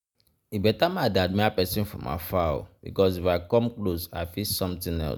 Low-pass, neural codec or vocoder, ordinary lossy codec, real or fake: none; none; none; real